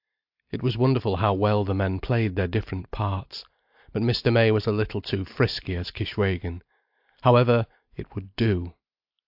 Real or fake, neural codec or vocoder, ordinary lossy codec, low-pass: real; none; AAC, 48 kbps; 5.4 kHz